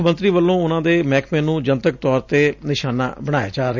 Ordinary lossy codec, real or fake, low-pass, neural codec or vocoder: none; real; 7.2 kHz; none